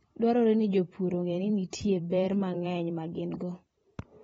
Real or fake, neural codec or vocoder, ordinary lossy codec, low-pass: fake; vocoder, 44.1 kHz, 128 mel bands every 512 samples, BigVGAN v2; AAC, 24 kbps; 19.8 kHz